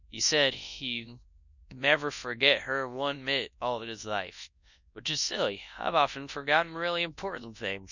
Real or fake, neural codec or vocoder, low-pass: fake; codec, 24 kHz, 0.9 kbps, WavTokenizer, large speech release; 7.2 kHz